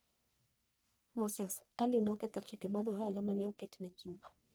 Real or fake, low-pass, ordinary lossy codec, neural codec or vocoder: fake; none; none; codec, 44.1 kHz, 1.7 kbps, Pupu-Codec